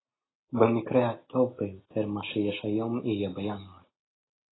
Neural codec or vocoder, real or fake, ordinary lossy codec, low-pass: none; real; AAC, 16 kbps; 7.2 kHz